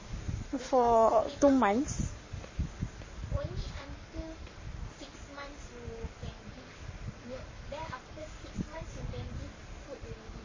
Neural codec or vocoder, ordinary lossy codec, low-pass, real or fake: codec, 44.1 kHz, 7.8 kbps, Pupu-Codec; MP3, 32 kbps; 7.2 kHz; fake